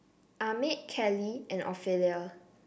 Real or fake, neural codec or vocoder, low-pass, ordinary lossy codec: real; none; none; none